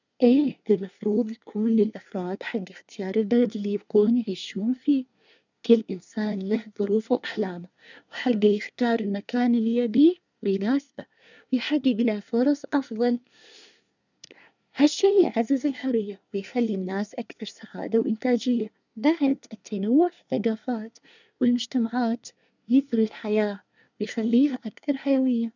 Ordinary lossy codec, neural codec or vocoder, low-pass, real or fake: none; codec, 24 kHz, 1 kbps, SNAC; 7.2 kHz; fake